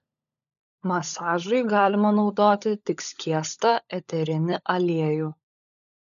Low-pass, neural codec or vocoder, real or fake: 7.2 kHz; codec, 16 kHz, 16 kbps, FunCodec, trained on LibriTTS, 50 frames a second; fake